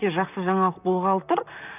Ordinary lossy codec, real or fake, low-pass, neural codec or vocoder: none; fake; 3.6 kHz; vocoder, 44.1 kHz, 128 mel bands, Pupu-Vocoder